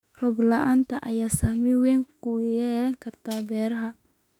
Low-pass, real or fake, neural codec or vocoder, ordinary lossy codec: 19.8 kHz; fake; autoencoder, 48 kHz, 32 numbers a frame, DAC-VAE, trained on Japanese speech; none